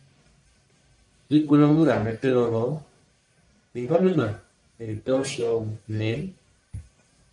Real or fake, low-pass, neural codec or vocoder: fake; 10.8 kHz; codec, 44.1 kHz, 1.7 kbps, Pupu-Codec